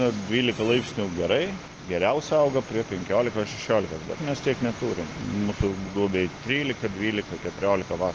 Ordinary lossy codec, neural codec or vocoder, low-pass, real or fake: Opus, 16 kbps; none; 7.2 kHz; real